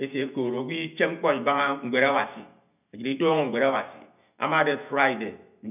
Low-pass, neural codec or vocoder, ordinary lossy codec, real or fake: 3.6 kHz; none; none; real